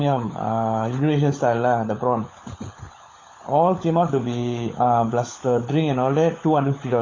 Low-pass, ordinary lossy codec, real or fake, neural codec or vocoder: 7.2 kHz; AAC, 32 kbps; fake; codec, 16 kHz, 16 kbps, FunCodec, trained on LibriTTS, 50 frames a second